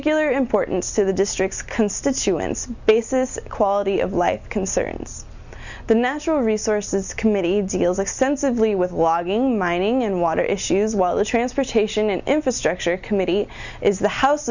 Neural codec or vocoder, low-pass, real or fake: none; 7.2 kHz; real